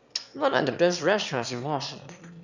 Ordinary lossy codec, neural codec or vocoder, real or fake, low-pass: none; autoencoder, 22.05 kHz, a latent of 192 numbers a frame, VITS, trained on one speaker; fake; 7.2 kHz